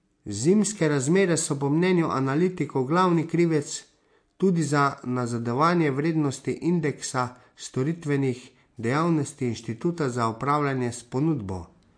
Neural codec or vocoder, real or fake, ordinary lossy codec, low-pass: none; real; MP3, 48 kbps; 9.9 kHz